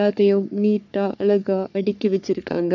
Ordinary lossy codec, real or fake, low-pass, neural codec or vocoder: none; fake; 7.2 kHz; codec, 44.1 kHz, 3.4 kbps, Pupu-Codec